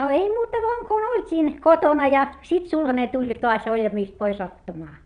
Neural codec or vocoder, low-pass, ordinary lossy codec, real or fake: vocoder, 22.05 kHz, 80 mel bands, Vocos; 9.9 kHz; none; fake